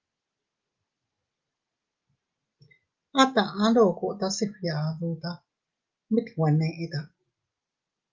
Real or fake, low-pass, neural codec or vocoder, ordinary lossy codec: real; 7.2 kHz; none; Opus, 32 kbps